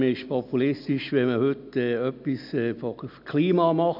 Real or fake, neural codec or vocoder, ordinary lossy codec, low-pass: real; none; none; 5.4 kHz